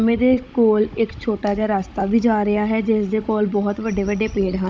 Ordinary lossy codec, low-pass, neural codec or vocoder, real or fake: none; none; codec, 16 kHz, 16 kbps, FunCodec, trained on Chinese and English, 50 frames a second; fake